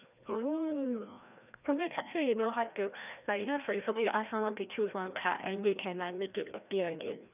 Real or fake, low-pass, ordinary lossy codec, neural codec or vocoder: fake; 3.6 kHz; none; codec, 16 kHz, 1 kbps, FreqCodec, larger model